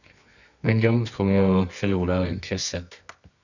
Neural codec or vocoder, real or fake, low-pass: codec, 24 kHz, 0.9 kbps, WavTokenizer, medium music audio release; fake; 7.2 kHz